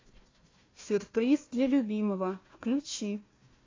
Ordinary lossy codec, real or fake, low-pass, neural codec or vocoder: AAC, 32 kbps; fake; 7.2 kHz; codec, 16 kHz, 1 kbps, FunCodec, trained on Chinese and English, 50 frames a second